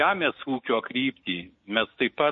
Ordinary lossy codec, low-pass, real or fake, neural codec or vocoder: MP3, 32 kbps; 7.2 kHz; fake; codec, 16 kHz, 6 kbps, DAC